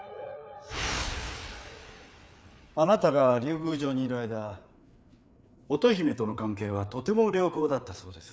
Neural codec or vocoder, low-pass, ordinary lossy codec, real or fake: codec, 16 kHz, 4 kbps, FreqCodec, larger model; none; none; fake